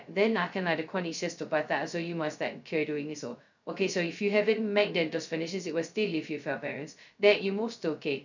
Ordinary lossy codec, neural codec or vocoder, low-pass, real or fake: none; codec, 16 kHz, 0.2 kbps, FocalCodec; 7.2 kHz; fake